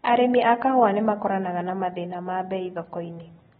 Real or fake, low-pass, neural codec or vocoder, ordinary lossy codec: real; 19.8 kHz; none; AAC, 16 kbps